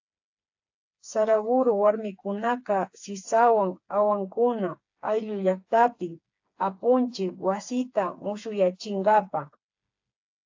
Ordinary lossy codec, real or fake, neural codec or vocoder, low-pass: AAC, 48 kbps; fake; codec, 16 kHz, 4 kbps, FreqCodec, smaller model; 7.2 kHz